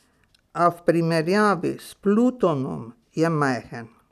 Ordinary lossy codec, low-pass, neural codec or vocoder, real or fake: none; 14.4 kHz; none; real